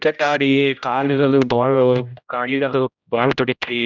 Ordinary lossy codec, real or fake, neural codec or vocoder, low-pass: none; fake; codec, 16 kHz, 0.5 kbps, X-Codec, HuBERT features, trained on general audio; 7.2 kHz